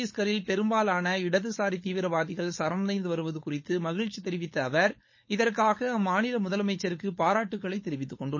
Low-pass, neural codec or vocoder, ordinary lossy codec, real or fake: 7.2 kHz; codec, 16 kHz, 4.8 kbps, FACodec; MP3, 32 kbps; fake